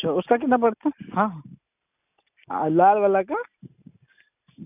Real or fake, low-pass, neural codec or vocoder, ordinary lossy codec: real; 3.6 kHz; none; none